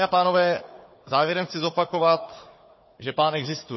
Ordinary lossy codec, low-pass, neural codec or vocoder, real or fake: MP3, 24 kbps; 7.2 kHz; codec, 16 kHz, 4 kbps, FunCodec, trained on Chinese and English, 50 frames a second; fake